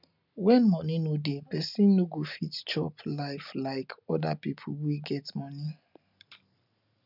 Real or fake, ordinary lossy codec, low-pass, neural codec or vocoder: real; none; 5.4 kHz; none